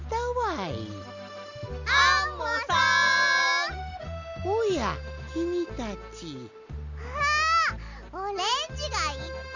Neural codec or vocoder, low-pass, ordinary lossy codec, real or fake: none; 7.2 kHz; none; real